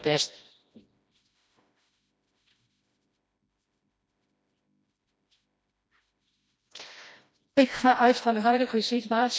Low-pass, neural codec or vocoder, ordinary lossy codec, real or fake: none; codec, 16 kHz, 1 kbps, FreqCodec, smaller model; none; fake